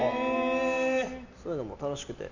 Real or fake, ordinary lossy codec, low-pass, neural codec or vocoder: real; none; 7.2 kHz; none